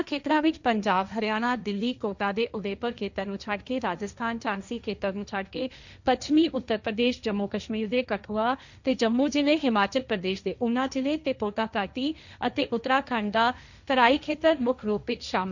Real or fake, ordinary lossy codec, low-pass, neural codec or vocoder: fake; none; 7.2 kHz; codec, 16 kHz, 1.1 kbps, Voila-Tokenizer